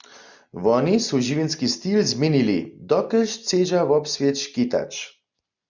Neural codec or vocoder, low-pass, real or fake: none; 7.2 kHz; real